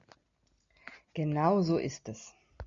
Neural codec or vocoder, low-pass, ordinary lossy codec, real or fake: none; 7.2 kHz; MP3, 64 kbps; real